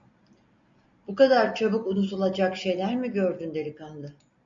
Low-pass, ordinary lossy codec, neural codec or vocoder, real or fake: 7.2 kHz; MP3, 64 kbps; none; real